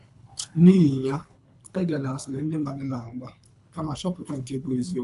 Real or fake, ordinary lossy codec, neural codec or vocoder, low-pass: fake; AAC, 96 kbps; codec, 24 kHz, 3 kbps, HILCodec; 10.8 kHz